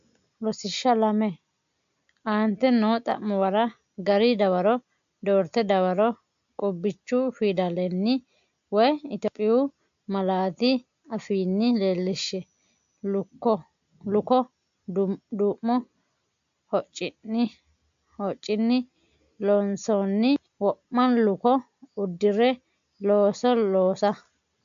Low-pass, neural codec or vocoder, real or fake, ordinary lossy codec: 7.2 kHz; none; real; AAC, 64 kbps